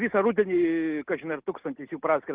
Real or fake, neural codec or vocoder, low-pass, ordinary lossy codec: real; none; 3.6 kHz; Opus, 16 kbps